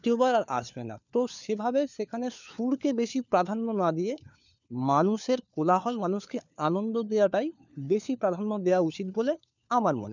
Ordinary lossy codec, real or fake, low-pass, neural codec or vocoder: none; fake; 7.2 kHz; codec, 16 kHz, 4 kbps, FunCodec, trained on LibriTTS, 50 frames a second